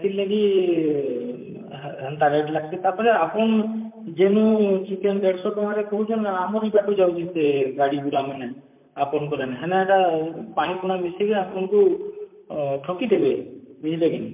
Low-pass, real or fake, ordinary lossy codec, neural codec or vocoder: 3.6 kHz; fake; MP3, 32 kbps; vocoder, 44.1 kHz, 128 mel bands, Pupu-Vocoder